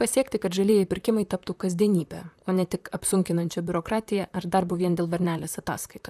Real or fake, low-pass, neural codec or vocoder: fake; 14.4 kHz; vocoder, 44.1 kHz, 128 mel bands, Pupu-Vocoder